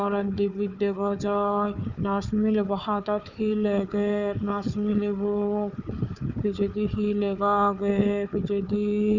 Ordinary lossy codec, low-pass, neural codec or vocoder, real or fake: none; 7.2 kHz; codec, 16 kHz, 4 kbps, FunCodec, trained on Chinese and English, 50 frames a second; fake